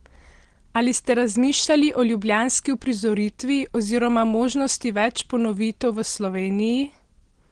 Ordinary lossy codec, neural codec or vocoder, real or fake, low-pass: Opus, 16 kbps; none; real; 9.9 kHz